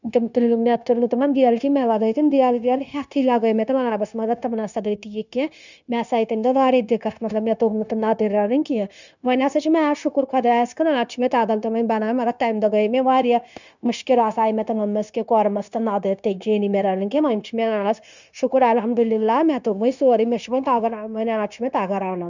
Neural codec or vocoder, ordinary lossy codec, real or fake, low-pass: codec, 16 kHz, 0.9 kbps, LongCat-Audio-Codec; none; fake; 7.2 kHz